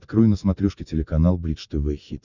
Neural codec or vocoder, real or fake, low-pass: none; real; 7.2 kHz